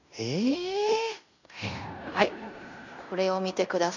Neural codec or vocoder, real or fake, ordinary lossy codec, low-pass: codec, 16 kHz in and 24 kHz out, 0.9 kbps, LongCat-Audio-Codec, fine tuned four codebook decoder; fake; none; 7.2 kHz